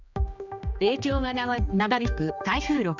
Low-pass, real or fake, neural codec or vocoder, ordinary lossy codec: 7.2 kHz; fake; codec, 16 kHz, 2 kbps, X-Codec, HuBERT features, trained on general audio; none